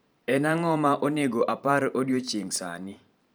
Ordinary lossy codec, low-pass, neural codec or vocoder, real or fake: none; none; vocoder, 44.1 kHz, 128 mel bands every 512 samples, BigVGAN v2; fake